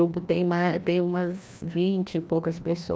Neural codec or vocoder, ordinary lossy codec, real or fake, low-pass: codec, 16 kHz, 1 kbps, FreqCodec, larger model; none; fake; none